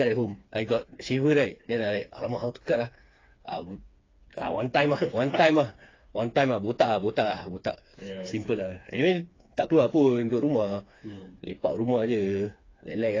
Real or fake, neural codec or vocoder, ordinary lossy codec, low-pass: fake; codec, 16 kHz, 4 kbps, FreqCodec, smaller model; AAC, 32 kbps; 7.2 kHz